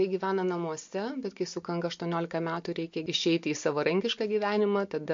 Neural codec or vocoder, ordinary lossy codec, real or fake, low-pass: none; MP3, 48 kbps; real; 7.2 kHz